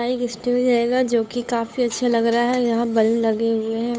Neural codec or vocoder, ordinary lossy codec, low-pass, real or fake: codec, 16 kHz, 8 kbps, FunCodec, trained on Chinese and English, 25 frames a second; none; none; fake